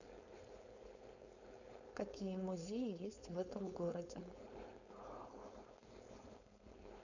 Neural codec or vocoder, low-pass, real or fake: codec, 16 kHz, 4.8 kbps, FACodec; 7.2 kHz; fake